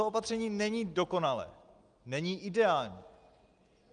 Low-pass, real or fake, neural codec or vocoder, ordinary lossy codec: 9.9 kHz; real; none; Opus, 32 kbps